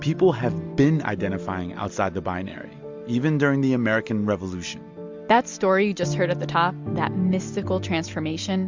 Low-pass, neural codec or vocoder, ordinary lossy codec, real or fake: 7.2 kHz; none; MP3, 64 kbps; real